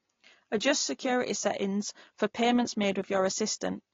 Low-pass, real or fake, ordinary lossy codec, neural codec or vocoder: 7.2 kHz; real; AAC, 32 kbps; none